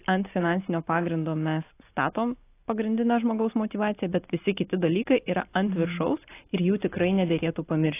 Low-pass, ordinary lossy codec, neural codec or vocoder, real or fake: 3.6 kHz; AAC, 24 kbps; none; real